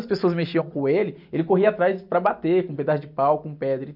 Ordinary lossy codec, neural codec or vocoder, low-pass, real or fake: none; none; 5.4 kHz; real